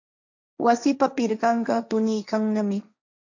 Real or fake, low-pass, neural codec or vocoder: fake; 7.2 kHz; codec, 16 kHz, 1.1 kbps, Voila-Tokenizer